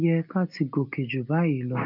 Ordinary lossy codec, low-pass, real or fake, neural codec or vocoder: none; 5.4 kHz; real; none